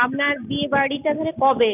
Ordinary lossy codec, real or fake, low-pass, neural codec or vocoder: none; real; 3.6 kHz; none